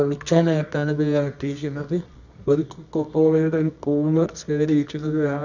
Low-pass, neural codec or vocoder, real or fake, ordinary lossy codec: 7.2 kHz; codec, 24 kHz, 0.9 kbps, WavTokenizer, medium music audio release; fake; none